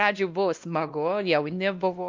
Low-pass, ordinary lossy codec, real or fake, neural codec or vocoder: 7.2 kHz; Opus, 32 kbps; fake; codec, 16 kHz, 1 kbps, X-Codec, WavLM features, trained on Multilingual LibriSpeech